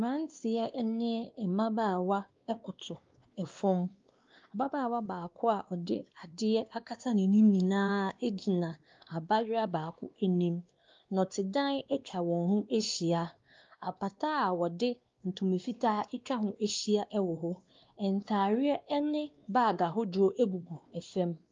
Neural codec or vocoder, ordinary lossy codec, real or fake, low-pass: codec, 16 kHz, 2 kbps, X-Codec, WavLM features, trained on Multilingual LibriSpeech; Opus, 32 kbps; fake; 7.2 kHz